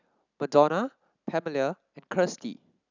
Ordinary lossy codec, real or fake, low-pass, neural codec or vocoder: none; real; 7.2 kHz; none